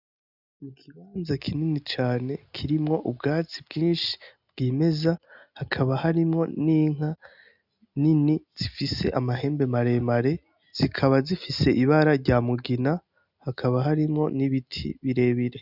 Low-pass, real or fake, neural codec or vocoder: 5.4 kHz; real; none